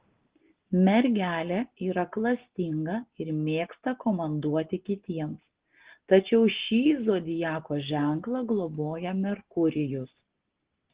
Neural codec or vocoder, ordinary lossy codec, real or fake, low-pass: none; Opus, 16 kbps; real; 3.6 kHz